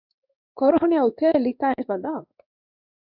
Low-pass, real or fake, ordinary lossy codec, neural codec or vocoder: 5.4 kHz; fake; AAC, 48 kbps; codec, 16 kHz in and 24 kHz out, 1 kbps, XY-Tokenizer